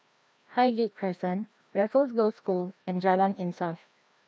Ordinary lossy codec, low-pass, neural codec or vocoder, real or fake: none; none; codec, 16 kHz, 1 kbps, FreqCodec, larger model; fake